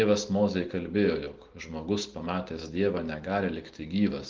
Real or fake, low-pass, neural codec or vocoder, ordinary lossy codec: real; 7.2 kHz; none; Opus, 16 kbps